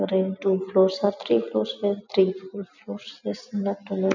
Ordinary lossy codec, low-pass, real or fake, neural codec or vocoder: none; 7.2 kHz; real; none